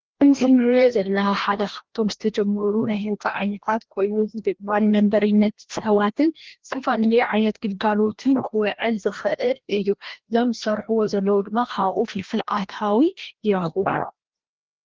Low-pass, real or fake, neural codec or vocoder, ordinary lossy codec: 7.2 kHz; fake; codec, 16 kHz, 1 kbps, FreqCodec, larger model; Opus, 16 kbps